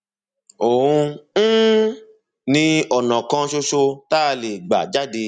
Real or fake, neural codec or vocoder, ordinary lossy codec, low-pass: real; none; none; 9.9 kHz